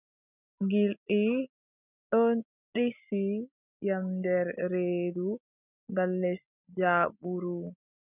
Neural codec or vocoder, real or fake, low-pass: none; real; 3.6 kHz